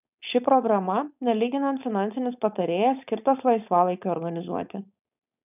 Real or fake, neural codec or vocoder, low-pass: fake; codec, 16 kHz, 4.8 kbps, FACodec; 3.6 kHz